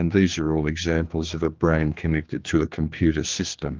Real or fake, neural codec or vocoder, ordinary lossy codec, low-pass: fake; codec, 16 kHz, 2 kbps, X-Codec, HuBERT features, trained on general audio; Opus, 24 kbps; 7.2 kHz